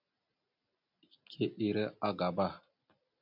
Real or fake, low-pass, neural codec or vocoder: real; 5.4 kHz; none